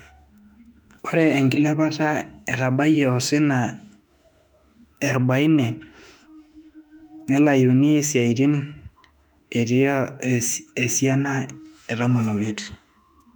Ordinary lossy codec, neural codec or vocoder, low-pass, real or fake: none; autoencoder, 48 kHz, 32 numbers a frame, DAC-VAE, trained on Japanese speech; 19.8 kHz; fake